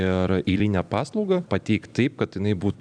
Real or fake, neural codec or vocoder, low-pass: fake; vocoder, 44.1 kHz, 128 mel bands every 256 samples, BigVGAN v2; 9.9 kHz